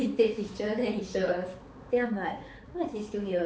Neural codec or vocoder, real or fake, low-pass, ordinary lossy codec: codec, 16 kHz, 4 kbps, X-Codec, HuBERT features, trained on balanced general audio; fake; none; none